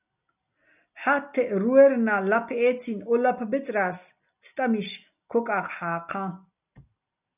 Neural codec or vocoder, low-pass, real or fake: none; 3.6 kHz; real